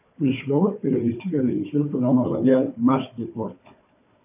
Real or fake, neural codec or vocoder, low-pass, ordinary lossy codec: fake; codec, 16 kHz, 4 kbps, FunCodec, trained on Chinese and English, 50 frames a second; 3.6 kHz; MP3, 32 kbps